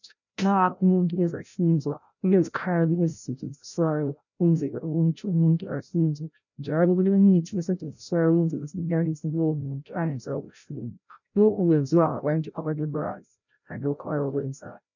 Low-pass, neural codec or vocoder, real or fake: 7.2 kHz; codec, 16 kHz, 0.5 kbps, FreqCodec, larger model; fake